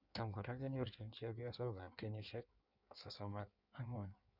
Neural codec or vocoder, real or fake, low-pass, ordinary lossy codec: codec, 16 kHz in and 24 kHz out, 1.1 kbps, FireRedTTS-2 codec; fake; 5.4 kHz; Opus, 64 kbps